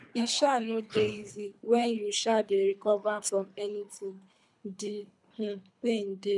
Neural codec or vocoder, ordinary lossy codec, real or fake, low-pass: codec, 24 kHz, 3 kbps, HILCodec; none; fake; 10.8 kHz